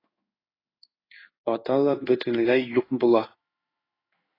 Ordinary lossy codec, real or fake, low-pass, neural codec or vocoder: AAC, 24 kbps; fake; 5.4 kHz; codec, 16 kHz in and 24 kHz out, 1 kbps, XY-Tokenizer